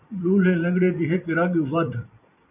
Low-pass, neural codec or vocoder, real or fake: 3.6 kHz; vocoder, 44.1 kHz, 128 mel bands every 256 samples, BigVGAN v2; fake